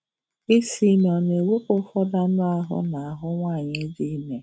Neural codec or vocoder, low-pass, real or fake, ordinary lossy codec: none; none; real; none